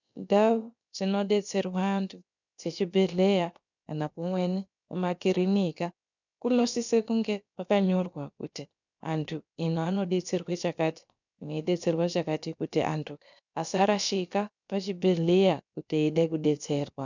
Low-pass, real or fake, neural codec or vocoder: 7.2 kHz; fake; codec, 16 kHz, about 1 kbps, DyCAST, with the encoder's durations